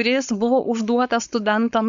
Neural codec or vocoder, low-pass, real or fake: codec, 16 kHz, 4.8 kbps, FACodec; 7.2 kHz; fake